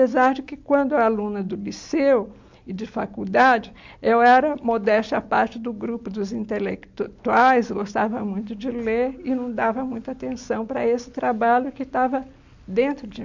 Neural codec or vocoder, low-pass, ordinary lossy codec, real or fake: none; 7.2 kHz; MP3, 64 kbps; real